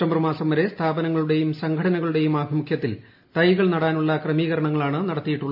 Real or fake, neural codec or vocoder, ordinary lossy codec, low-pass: real; none; MP3, 48 kbps; 5.4 kHz